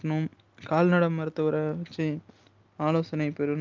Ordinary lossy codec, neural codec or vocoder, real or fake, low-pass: Opus, 32 kbps; none; real; 7.2 kHz